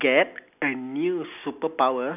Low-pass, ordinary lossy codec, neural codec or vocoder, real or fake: 3.6 kHz; none; none; real